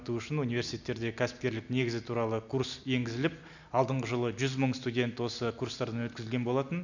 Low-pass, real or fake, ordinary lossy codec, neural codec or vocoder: 7.2 kHz; real; none; none